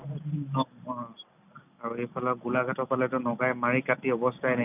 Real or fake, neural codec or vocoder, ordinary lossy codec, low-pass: real; none; none; 3.6 kHz